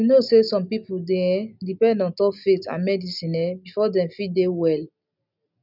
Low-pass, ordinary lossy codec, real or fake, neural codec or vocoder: 5.4 kHz; none; real; none